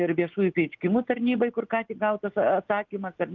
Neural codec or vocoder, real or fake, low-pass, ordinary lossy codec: none; real; 7.2 kHz; Opus, 32 kbps